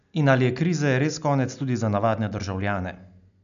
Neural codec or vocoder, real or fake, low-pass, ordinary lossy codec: none; real; 7.2 kHz; none